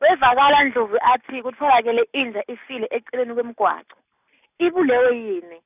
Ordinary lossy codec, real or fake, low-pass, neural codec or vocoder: none; real; 3.6 kHz; none